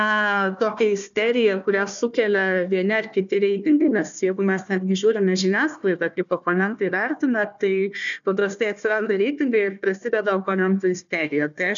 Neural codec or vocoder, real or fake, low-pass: codec, 16 kHz, 1 kbps, FunCodec, trained on Chinese and English, 50 frames a second; fake; 7.2 kHz